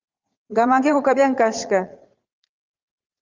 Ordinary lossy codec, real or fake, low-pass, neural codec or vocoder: Opus, 32 kbps; fake; 7.2 kHz; vocoder, 44.1 kHz, 80 mel bands, Vocos